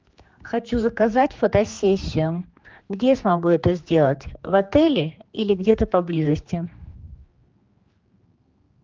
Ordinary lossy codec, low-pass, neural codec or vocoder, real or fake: Opus, 32 kbps; 7.2 kHz; codec, 16 kHz, 2 kbps, X-Codec, HuBERT features, trained on general audio; fake